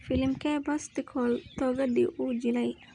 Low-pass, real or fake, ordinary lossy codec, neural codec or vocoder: 9.9 kHz; real; none; none